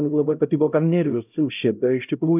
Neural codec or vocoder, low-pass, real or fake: codec, 16 kHz, 0.5 kbps, X-Codec, HuBERT features, trained on LibriSpeech; 3.6 kHz; fake